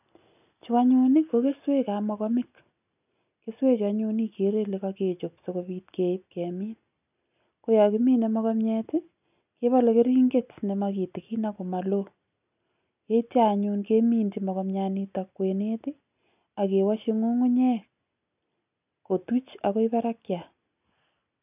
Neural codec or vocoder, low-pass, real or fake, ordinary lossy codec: none; 3.6 kHz; real; none